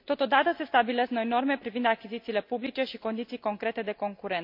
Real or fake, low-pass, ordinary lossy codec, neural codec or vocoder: real; 5.4 kHz; MP3, 48 kbps; none